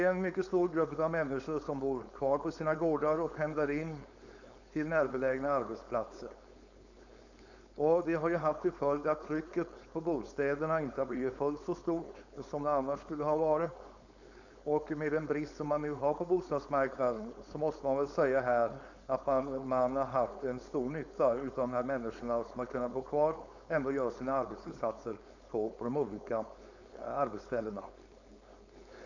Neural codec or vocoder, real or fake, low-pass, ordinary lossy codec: codec, 16 kHz, 4.8 kbps, FACodec; fake; 7.2 kHz; none